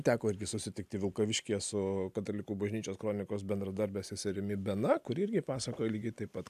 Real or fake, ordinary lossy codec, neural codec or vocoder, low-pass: real; AAC, 96 kbps; none; 14.4 kHz